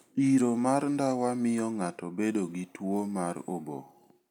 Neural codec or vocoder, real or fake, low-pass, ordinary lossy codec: none; real; 19.8 kHz; none